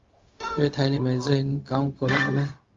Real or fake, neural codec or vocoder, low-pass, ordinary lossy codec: fake; codec, 16 kHz, 0.4 kbps, LongCat-Audio-Codec; 7.2 kHz; Opus, 32 kbps